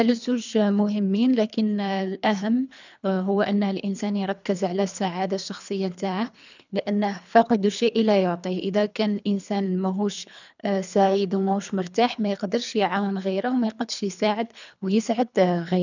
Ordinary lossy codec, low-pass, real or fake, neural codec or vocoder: none; 7.2 kHz; fake; codec, 24 kHz, 3 kbps, HILCodec